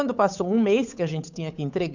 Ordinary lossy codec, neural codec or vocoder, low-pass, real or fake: none; codec, 16 kHz, 8 kbps, FunCodec, trained on Chinese and English, 25 frames a second; 7.2 kHz; fake